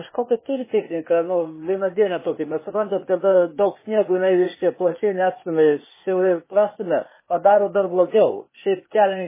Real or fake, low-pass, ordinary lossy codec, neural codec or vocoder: fake; 3.6 kHz; MP3, 16 kbps; codec, 16 kHz, 0.8 kbps, ZipCodec